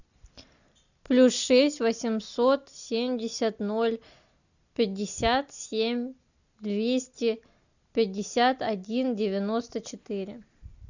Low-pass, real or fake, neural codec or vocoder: 7.2 kHz; real; none